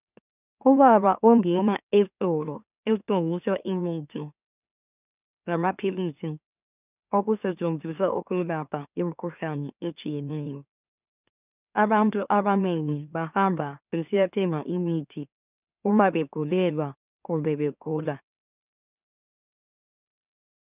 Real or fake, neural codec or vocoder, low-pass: fake; autoencoder, 44.1 kHz, a latent of 192 numbers a frame, MeloTTS; 3.6 kHz